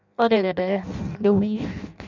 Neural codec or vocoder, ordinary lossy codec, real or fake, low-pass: codec, 16 kHz in and 24 kHz out, 0.6 kbps, FireRedTTS-2 codec; none; fake; 7.2 kHz